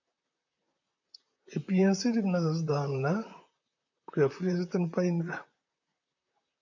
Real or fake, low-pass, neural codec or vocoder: fake; 7.2 kHz; vocoder, 44.1 kHz, 128 mel bands, Pupu-Vocoder